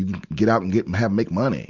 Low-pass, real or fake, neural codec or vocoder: 7.2 kHz; real; none